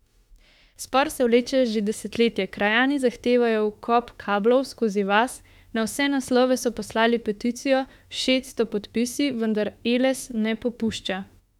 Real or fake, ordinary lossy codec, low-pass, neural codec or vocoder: fake; none; 19.8 kHz; autoencoder, 48 kHz, 32 numbers a frame, DAC-VAE, trained on Japanese speech